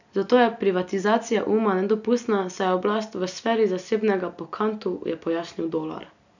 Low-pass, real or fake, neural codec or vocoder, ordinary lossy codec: 7.2 kHz; real; none; none